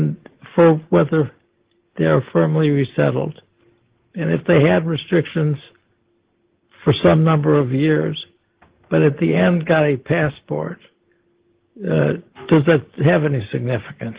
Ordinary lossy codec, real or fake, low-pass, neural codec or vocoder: Opus, 16 kbps; real; 3.6 kHz; none